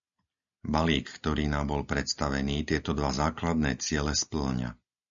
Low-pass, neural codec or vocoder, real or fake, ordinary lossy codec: 7.2 kHz; none; real; MP3, 64 kbps